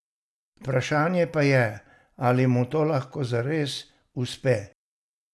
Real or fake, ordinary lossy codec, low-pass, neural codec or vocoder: real; none; none; none